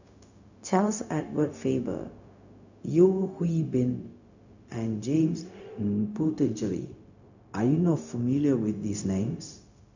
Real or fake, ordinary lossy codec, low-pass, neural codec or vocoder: fake; none; 7.2 kHz; codec, 16 kHz, 0.4 kbps, LongCat-Audio-Codec